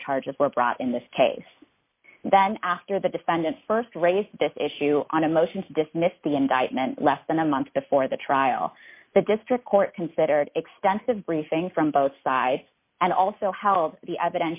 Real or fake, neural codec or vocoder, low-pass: real; none; 3.6 kHz